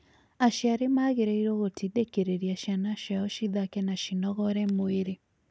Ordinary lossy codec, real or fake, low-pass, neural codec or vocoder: none; real; none; none